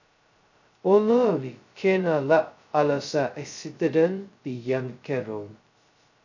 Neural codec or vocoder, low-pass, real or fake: codec, 16 kHz, 0.2 kbps, FocalCodec; 7.2 kHz; fake